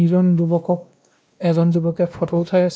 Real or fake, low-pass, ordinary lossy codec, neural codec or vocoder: fake; none; none; codec, 16 kHz, 1 kbps, X-Codec, WavLM features, trained on Multilingual LibriSpeech